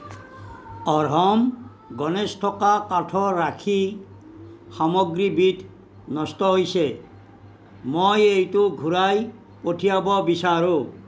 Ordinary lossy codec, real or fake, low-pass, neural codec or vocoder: none; real; none; none